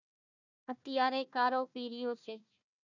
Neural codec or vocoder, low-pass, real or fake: codec, 16 kHz, 1 kbps, FunCodec, trained on Chinese and English, 50 frames a second; 7.2 kHz; fake